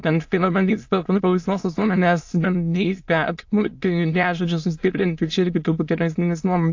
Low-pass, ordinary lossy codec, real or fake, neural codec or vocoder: 7.2 kHz; AAC, 48 kbps; fake; autoencoder, 22.05 kHz, a latent of 192 numbers a frame, VITS, trained on many speakers